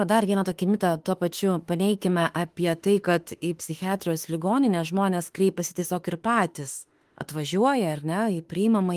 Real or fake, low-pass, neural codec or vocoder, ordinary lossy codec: fake; 14.4 kHz; autoencoder, 48 kHz, 32 numbers a frame, DAC-VAE, trained on Japanese speech; Opus, 24 kbps